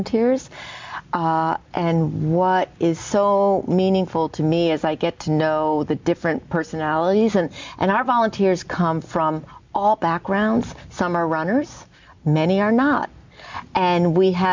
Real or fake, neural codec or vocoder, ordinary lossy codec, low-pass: real; none; MP3, 64 kbps; 7.2 kHz